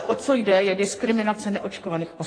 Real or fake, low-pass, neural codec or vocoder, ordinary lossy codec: fake; 9.9 kHz; codec, 16 kHz in and 24 kHz out, 1.1 kbps, FireRedTTS-2 codec; AAC, 32 kbps